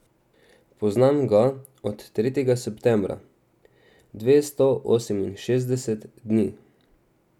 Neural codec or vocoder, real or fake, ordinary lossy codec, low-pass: none; real; none; 19.8 kHz